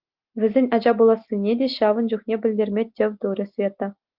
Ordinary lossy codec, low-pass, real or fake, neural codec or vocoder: Opus, 32 kbps; 5.4 kHz; real; none